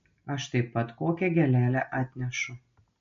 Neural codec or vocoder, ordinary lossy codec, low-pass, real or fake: none; MP3, 48 kbps; 7.2 kHz; real